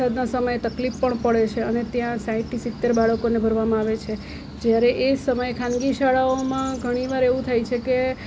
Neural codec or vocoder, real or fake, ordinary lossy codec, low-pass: none; real; none; none